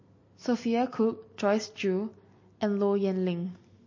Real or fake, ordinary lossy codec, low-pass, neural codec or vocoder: real; MP3, 32 kbps; 7.2 kHz; none